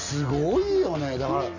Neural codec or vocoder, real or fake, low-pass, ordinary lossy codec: none; real; 7.2 kHz; none